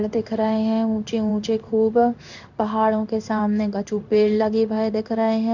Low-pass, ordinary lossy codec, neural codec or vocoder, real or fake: 7.2 kHz; none; codec, 16 kHz in and 24 kHz out, 1 kbps, XY-Tokenizer; fake